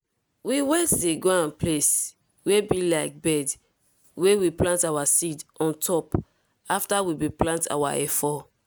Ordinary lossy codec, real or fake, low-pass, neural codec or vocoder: none; real; none; none